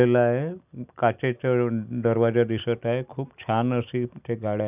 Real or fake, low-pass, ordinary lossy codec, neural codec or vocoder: real; 3.6 kHz; none; none